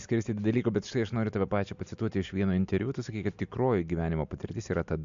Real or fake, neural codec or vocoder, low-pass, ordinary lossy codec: real; none; 7.2 kHz; MP3, 64 kbps